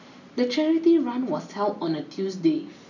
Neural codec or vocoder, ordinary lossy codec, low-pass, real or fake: none; none; 7.2 kHz; real